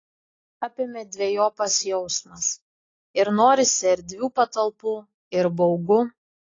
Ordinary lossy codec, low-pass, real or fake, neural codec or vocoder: AAC, 32 kbps; 7.2 kHz; real; none